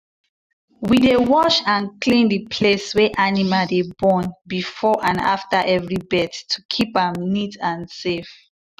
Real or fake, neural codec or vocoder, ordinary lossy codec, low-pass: real; none; none; 14.4 kHz